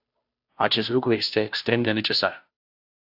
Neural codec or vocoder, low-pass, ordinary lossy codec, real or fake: codec, 16 kHz, 0.5 kbps, FunCodec, trained on Chinese and English, 25 frames a second; 5.4 kHz; AAC, 48 kbps; fake